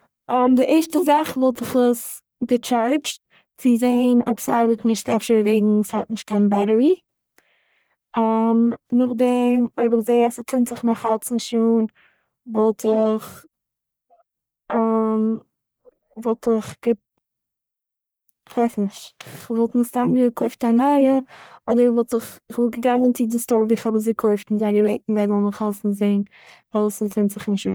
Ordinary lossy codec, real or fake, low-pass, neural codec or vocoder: none; fake; none; codec, 44.1 kHz, 1.7 kbps, Pupu-Codec